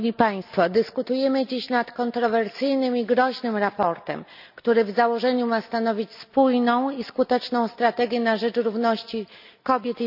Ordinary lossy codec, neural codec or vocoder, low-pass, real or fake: none; none; 5.4 kHz; real